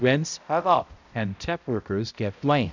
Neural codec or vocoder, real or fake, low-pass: codec, 16 kHz, 0.5 kbps, X-Codec, HuBERT features, trained on balanced general audio; fake; 7.2 kHz